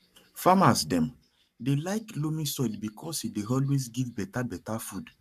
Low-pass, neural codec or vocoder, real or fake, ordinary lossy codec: 14.4 kHz; codec, 44.1 kHz, 7.8 kbps, Pupu-Codec; fake; MP3, 96 kbps